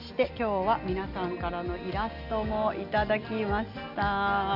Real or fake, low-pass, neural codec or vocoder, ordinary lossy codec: real; 5.4 kHz; none; none